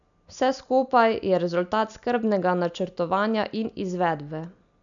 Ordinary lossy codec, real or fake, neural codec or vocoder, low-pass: none; real; none; 7.2 kHz